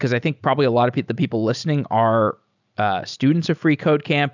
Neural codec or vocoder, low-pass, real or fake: none; 7.2 kHz; real